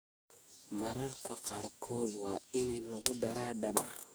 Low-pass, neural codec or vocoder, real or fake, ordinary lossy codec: none; codec, 44.1 kHz, 2.6 kbps, DAC; fake; none